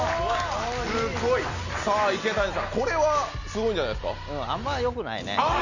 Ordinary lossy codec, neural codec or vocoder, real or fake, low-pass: none; none; real; 7.2 kHz